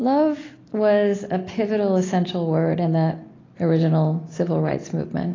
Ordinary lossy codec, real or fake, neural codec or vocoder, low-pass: AAC, 32 kbps; real; none; 7.2 kHz